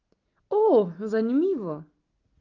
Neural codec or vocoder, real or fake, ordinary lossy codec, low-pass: none; real; Opus, 32 kbps; 7.2 kHz